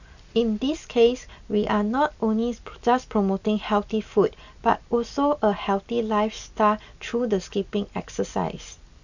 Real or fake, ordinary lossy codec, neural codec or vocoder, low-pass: real; none; none; 7.2 kHz